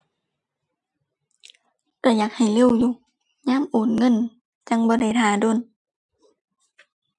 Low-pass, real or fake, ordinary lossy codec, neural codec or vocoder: 10.8 kHz; real; none; none